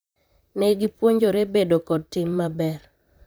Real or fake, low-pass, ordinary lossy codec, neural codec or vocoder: fake; none; none; vocoder, 44.1 kHz, 128 mel bands, Pupu-Vocoder